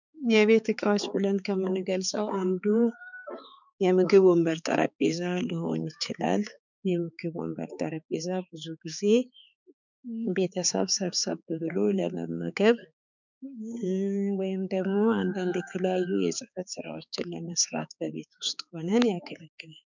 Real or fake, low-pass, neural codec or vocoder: fake; 7.2 kHz; codec, 16 kHz, 4 kbps, X-Codec, HuBERT features, trained on balanced general audio